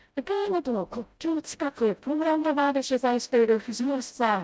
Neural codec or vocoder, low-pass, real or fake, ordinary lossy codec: codec, 16 kHz, 0.5 kbps, FreqCodec, smaller model; none; fake; none